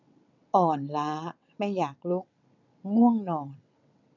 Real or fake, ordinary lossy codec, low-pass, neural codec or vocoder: fake; none; 7.2 kHz; vocoder, 22.05 kHz, 80 mel bands, WaveNeXt